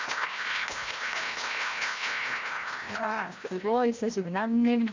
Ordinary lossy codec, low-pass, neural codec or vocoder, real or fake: MP3, 64 kbps; 7.2 kHz; codec, 16 kHz, 1 kbps, FreqCodec, larger model; fake